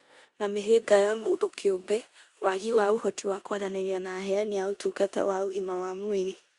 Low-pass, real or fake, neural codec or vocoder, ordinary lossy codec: 10.8 kHz; fake; codec, 16 kHz in and 24 kHz out, 0.9 kbps, LongCat-Audio-Codec, four codebook decoder; Opus, 64 kbps